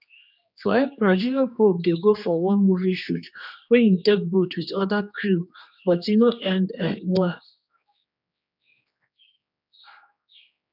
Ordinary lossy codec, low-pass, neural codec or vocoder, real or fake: none; 5.4 kHz; codec, 16 kHz, 2 kbps, X-Codec, HuBERT features, trained on general audio; fake